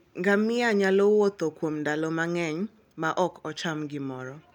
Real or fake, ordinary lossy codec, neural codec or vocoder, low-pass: real; none; none; 19.8 kHz